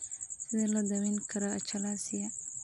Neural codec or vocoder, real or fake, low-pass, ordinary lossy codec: none; real; 10.8 kHz; none